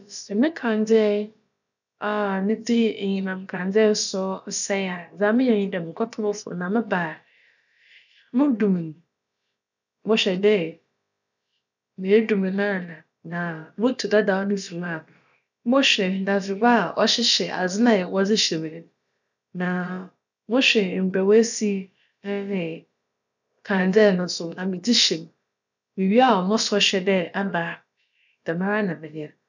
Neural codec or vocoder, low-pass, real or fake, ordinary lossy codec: codec, 16 kHz, about 1 kbps, DyCAST, with the encoder's durations; 7.2 kHz; fake; none